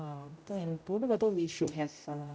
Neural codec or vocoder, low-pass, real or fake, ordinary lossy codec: codec, 16 kHz, 0.5 kbps, X-Codec, HuBERT features, trained on general audio; none; fake; none